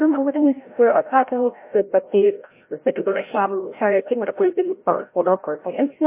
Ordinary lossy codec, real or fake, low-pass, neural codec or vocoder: MP3, 24 kbps; fake; 3.6 kHz; codec, 16 kHz, 0.5 kbps, FreqCodec, larger model